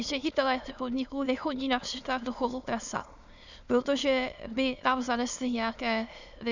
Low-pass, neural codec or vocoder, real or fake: 7.2 kHz; autoencoder, 22.05 kHz, a latent of 192 numbers a frame, VITS, trained on many speakers; fake